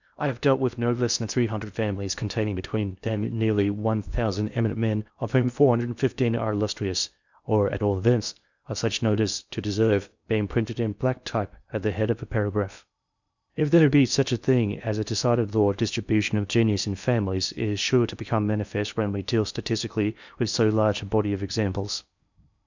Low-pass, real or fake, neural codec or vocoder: 7.2 kHz; fake; codec, 16 kHz in and 24 kHz out, 0.6 kbps, FocalCodec, streaming, 2048 codes